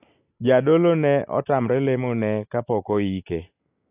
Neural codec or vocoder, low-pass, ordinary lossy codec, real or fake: none; 3.6 kHz; AAC, 32 kbps; real